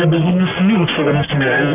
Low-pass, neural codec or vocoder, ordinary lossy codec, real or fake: 3.6 kHz; codec, 44.1 kHz, 1.7 kbps, Pupu-Codec; none; fake